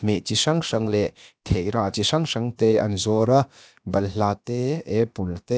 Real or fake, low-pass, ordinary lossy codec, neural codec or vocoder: fake; none; none; codec, 16 kHz, about 1 kbps, DyCAST, with the encoder's durations